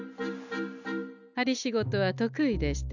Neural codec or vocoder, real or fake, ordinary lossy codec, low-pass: none; real; none; 7.2 kHz